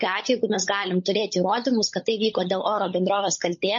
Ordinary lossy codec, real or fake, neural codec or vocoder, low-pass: MP3, 32 kbps; fake; codec, 16 kHz, 16 kbps, FunCodec, trained on LibriTTS, 50 frames a second; 7.2 kHz